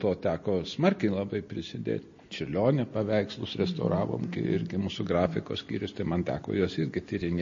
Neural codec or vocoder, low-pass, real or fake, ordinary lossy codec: none; 7.2 kHz; real; MP3, 32 kbps